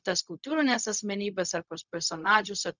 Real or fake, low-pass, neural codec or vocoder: fake; 7.2 kHz; codec, 16 kHz, 0.4 kbps, LongCat-Audio-Codec